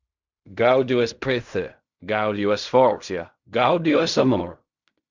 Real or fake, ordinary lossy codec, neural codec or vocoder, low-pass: fake; Opus, 64 kbps; codec, 16 kHz in and 24 kHz out, 0.4 kbps, LongCat-Audio-Codec, fine tuned four codebook decoder; 7.2 kHz